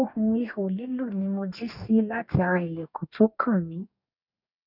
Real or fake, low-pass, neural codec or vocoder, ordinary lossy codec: fake; 5.4 kHz; codec, 44.1 kHz, 2.6 kbps, DAC; none